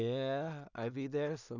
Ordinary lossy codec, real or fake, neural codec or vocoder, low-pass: none; fake; codec, 16 kHz in and 24 kHz out, 0.4 kbps, LongCat-Audio-Codec, two codebook decoder; 7.2 kHz